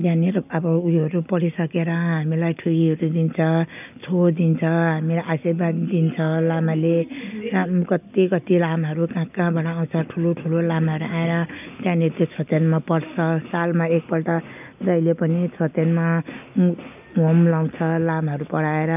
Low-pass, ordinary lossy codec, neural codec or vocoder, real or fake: 3.6 kHz; none; none; real